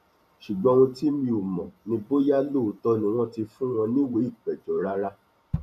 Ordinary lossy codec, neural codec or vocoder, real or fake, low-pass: none; vocoder, 44.1 kHz, 128 mel bands every 512 samples, BigVGAN v2; fake; 14.4 kHz